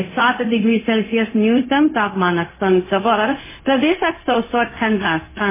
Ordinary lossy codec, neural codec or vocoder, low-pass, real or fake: MP3, 16 kbps; codec, 16 kHz, 0.4 kbps, LongCat-Audio-Codec; 3.6 kHz; fake